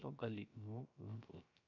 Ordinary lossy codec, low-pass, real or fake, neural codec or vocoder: none; 7.2 kHz; fake; codec, 16 kHz, 0.3 kbps, FocalCodec